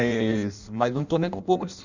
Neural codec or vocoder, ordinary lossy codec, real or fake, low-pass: codec, 16 kHz in and 24 kHz out, 0.6 kbps, FireRedTTS-2 codec; none; fake; 7.2 kHz